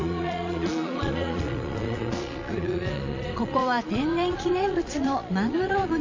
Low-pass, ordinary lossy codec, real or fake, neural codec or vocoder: 7.2 kHz; MP3, 48 kbps; fake; vocoder, 22.05 kHz, 80 mel bands, Vocos